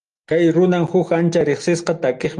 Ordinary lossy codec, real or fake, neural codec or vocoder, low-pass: Opus, 32 kbps; real; none; 10.8 kHz